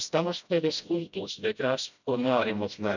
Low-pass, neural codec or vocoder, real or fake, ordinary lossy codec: 7.2 kHz; codec, 16 kHz, 0.5 kbps, FreqCodec, smaller model; fake; none